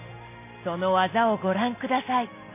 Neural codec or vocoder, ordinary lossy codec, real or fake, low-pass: none; MP3, 24 kbps; real; 3.6 kHz